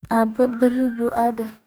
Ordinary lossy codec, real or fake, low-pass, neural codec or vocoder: none; fake; none; codec, 44.1 kHz, 2.6 kbps, DAC